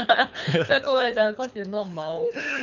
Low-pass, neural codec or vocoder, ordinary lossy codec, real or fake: 7.2 kHz; codec, 24 kHz, 3 kbps, HILCodec; none; fake